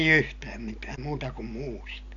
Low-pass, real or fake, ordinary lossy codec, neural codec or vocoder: 7.2 kHz; real; none; none